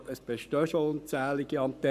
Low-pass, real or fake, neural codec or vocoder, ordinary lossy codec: 14.4 kHz; fake; codec, 44.1 kHz, 7.8 kbps, Pupu-Codec; none